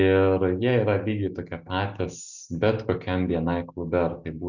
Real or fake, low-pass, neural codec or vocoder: real; 7.2 kHz; none